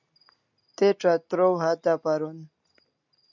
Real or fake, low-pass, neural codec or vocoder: real; 7.2 kHz; none